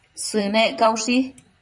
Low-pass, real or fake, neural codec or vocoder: 10.8 kHz; fake; vocoder, 44.1 kHz, 128 mel bands, Pupu-Vocoder